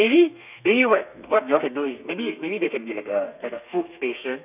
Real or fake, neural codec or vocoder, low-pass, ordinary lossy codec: fake; codec, 32 kHz, 1.9 kbps, SNAC; 3.6 kHz; none